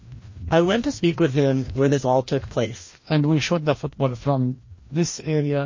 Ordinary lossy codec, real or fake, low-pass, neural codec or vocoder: MP3, 32 kbps; fake; 7.2 kHz; codec, 16 kHz, 1 kbps, FreqCodec, larger model